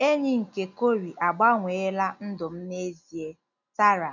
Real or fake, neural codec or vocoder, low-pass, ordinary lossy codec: real; none; 7.2 kHz; none